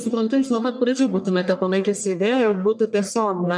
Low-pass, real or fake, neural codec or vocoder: 9.9 kHz; fake; codec, 44.1 kHz, 1.7 kbps, Pupu-Codec